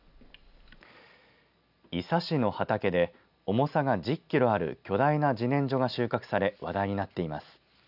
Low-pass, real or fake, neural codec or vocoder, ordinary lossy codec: 5.4 kHz; real; none; none